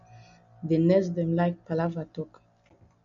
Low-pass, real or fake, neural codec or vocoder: 7.2 kHz; real; none